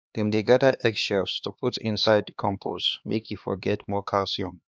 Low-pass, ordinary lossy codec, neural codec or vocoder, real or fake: none; none; codec, 16 kHz, 2 kbps, X-Codec, HuBERT features, trained on LibriSpeech; fake